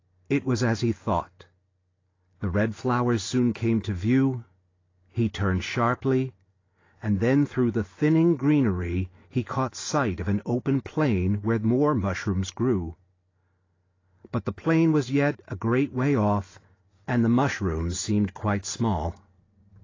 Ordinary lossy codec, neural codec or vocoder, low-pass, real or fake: AAC, 32 kbps; none; 7.2 kHz; real